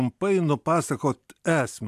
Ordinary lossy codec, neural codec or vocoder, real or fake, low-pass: AAC, 96 kbps; none; real; 14.4 kHz